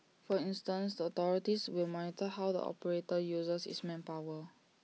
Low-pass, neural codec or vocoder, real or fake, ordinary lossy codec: none; none; real; none